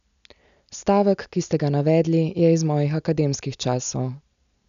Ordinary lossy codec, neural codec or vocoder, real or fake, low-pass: none; none; real; 7.2 kHz